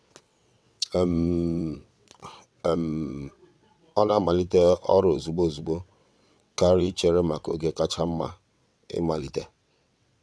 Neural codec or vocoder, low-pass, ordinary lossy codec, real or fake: vocoder, 22.05 kHz, 80 mel bands, WaveNeXt; none; none; fake